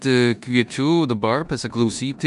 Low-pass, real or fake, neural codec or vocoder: 10.8 kHz; fake; codec, 16 kHz in and 24 kHz out, 0.9 kbps, LongCat-Audio-Codec, four codebook decoder